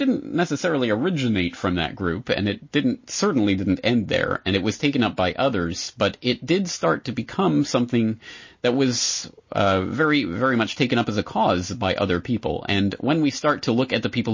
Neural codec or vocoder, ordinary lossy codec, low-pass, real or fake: none; MP3, 32 kbps; 7.2 kHz; real